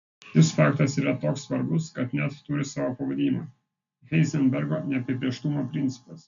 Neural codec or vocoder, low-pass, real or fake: none; 7.2 kHz; real